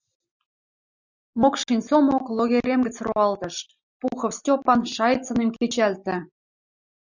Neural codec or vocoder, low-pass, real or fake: none; 7.2 kHz; real